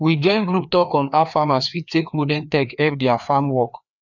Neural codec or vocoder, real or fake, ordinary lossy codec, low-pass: codec, 16 kHz, 2 kbps, FreqCodec, larger model; fake; none; 7.2 kHz